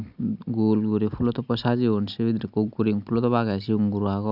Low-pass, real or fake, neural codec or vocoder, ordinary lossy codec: 5.4 kHz; real; none; none